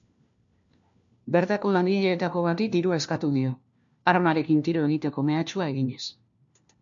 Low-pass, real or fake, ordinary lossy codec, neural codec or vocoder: 7.2 kHz; fake; MP3, 64 kbps; codec, 16 kHz, 1 kbps, FunCodec, trained on LibriTTS, 50 frames a second